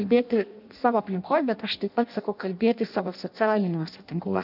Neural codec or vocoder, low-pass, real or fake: codec, 16 kHz in and 24 kHz out, 0.6 kbps, FireRedTTS-2 codec; 5.4 kHz; fake